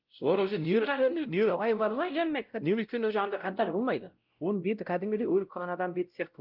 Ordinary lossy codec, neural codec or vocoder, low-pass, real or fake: Opus, 32 kbps; codec, 16 kHz, 0.5 kbps, X-Codec, WavLM features, trained on Multilingual LibriSpeech; 5.4 kHz; fake